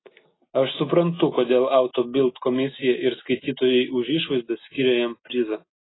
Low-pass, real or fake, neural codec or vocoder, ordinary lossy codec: 7.2 kHz; real; none; AAC, 16 kbps